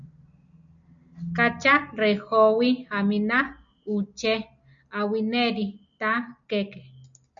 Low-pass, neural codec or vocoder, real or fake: 7.2 kHz; none; real